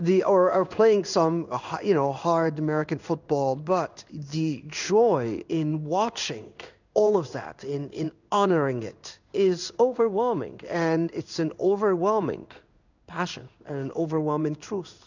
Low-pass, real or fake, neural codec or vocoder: 7.2 kHz; fake; codec, 16 kHz in and 24 kHz out, 1 kbps, XY-Tokenizer